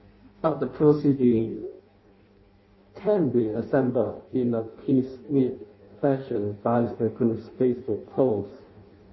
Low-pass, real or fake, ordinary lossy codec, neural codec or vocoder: 7.2 kHz; fake; MP3, 24 kbps; codec, 16 kHz in and 24 kHz out, 0.6 kbps, FireRedTTS-2 codec